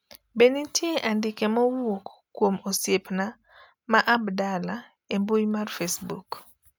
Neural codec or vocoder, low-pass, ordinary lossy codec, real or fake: none; none; none; real